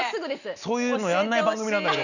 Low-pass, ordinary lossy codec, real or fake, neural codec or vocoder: 7.2 kHz; none; real; none